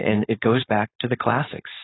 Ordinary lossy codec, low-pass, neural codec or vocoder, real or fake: AAC, 16 kbps; 7.2 kHz; codec, 16 kHz, 4.8 kbps, FACodec; fake